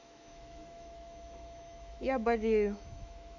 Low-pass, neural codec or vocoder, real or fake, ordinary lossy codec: 7.2 kHz; autoencoder, 48 kHz, 128 numbers a frame, DAC-VAE, trained on Japanese speech; fake; none